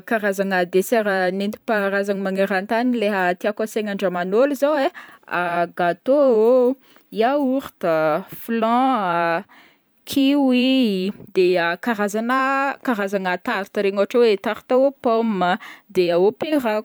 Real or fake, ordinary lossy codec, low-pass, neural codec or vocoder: fake; none; none; vocoder, 44.1 kHz, 128 mel bands every 512 samples, BigVGAN v2